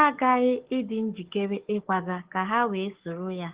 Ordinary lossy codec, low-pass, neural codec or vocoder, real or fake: Opus, 16 kbps; 3.6 kHz; codec, 44.1 kHz, 7.8 kbps, DAC; fake